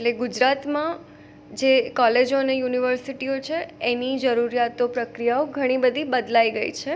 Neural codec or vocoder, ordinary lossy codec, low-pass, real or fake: none; none; none; real